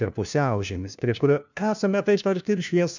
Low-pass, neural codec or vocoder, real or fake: 7.2 kHz; codec, 16 kHz, 1 kbps, FunCodec, trained on LibriTTS, 50 frames a second; fake